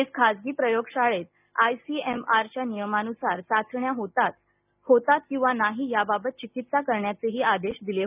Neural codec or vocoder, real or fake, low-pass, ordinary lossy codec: none; real; 3.6 kHz; none